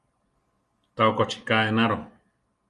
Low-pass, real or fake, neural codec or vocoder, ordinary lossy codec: 10.8 kHz; real; none; Opus, 32 kbps